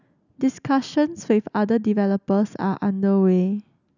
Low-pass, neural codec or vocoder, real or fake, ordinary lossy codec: 7.2 kHz; none; real; none